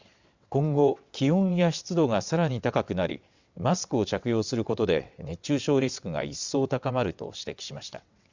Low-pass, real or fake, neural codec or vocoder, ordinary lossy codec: 7.2 kHz; fake; vocoder, 22.05 kHz, 80 mel bands, WaveNeXt; Opus, 64 kbps